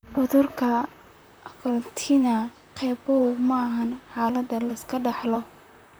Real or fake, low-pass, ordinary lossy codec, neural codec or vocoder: fake; none; none; vocoder, 44.1 kHz, 128 mel bands, Pupu-Vocoder